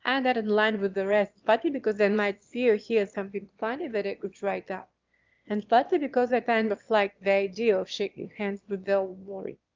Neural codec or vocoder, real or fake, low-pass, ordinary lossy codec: autoencoder, 22.05 kHz, a latent of 192 numbers a frame, VITS, trained on one speaker; fake; 7.2 kHz; Opus, 24 kbps